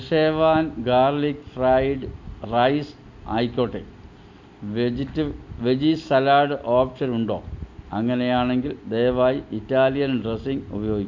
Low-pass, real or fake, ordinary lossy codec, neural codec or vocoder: 7.2 kHz; real; MP3, 64 kbps; none